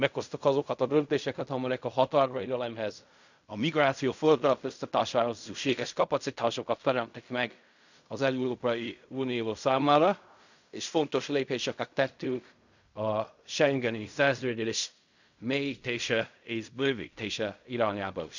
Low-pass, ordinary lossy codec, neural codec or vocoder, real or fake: 7.2 kHz; none; codec, 16 kHz in and 24 kHz out, 0.4 kbps, LongCat-Audio-Codec, fine tuned four codebook decoder; fake